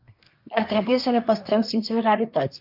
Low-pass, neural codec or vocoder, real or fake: 5.4 kHz; codec, 24 kHz, 1 kbps, SNAC; fake